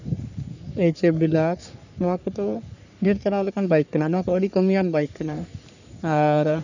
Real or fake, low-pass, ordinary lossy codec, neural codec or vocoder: fake; 7.2 kHz; none; codec, 44.1 kHz, 3.4 kbps, Pupu-Codec